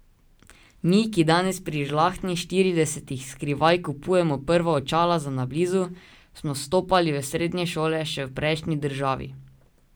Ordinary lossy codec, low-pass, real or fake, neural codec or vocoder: none; none; real; none